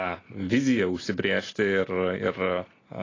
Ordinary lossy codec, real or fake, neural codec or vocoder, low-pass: AAC, 32 kbps; real; none; 7.2 kHz